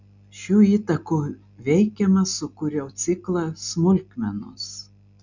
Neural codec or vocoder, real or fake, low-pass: none; real; 7.2 kHz